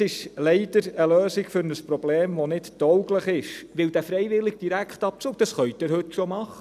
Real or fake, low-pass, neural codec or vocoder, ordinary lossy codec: real; 14.4 kHz; none; none